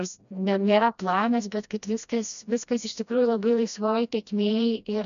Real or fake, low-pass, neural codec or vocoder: fake; 7.2 kHz; codec, 16 kHz, 1 kbps, FreqCodec, smaller model